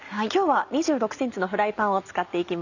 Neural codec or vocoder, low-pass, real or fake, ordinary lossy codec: none; 7.2 kHz; real; none